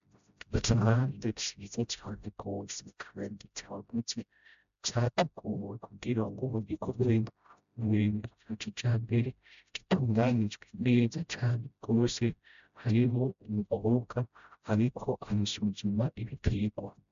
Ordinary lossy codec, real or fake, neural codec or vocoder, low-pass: AAC, 96 kbps; fake; codec, 16 kHz, 0.5 kbps, FreqCodec, smaller model; 7.2 kHz